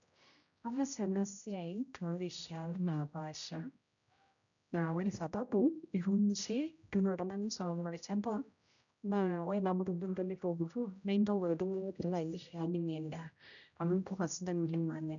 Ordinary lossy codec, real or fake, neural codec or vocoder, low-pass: none; fake; codec, 16 kHz, 0.5 kbps, X-Codec, HuBERT features, trained on general audio; 7.2 kHz